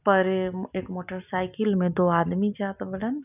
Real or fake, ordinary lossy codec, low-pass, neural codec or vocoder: real; none; 3.6 kHz; none